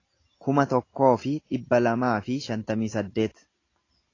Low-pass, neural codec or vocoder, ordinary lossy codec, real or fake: 7.2 kHz; none; AAC, 32 kbps; real